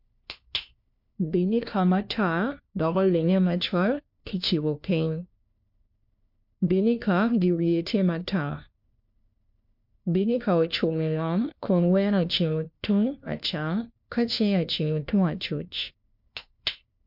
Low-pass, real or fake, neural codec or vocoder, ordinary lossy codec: 5.4 kHz; fake; codec, 16 kHz, 1 kbps, FunCodec, trained on LibriTTS, 50 frames a second; MP3, 48 kbps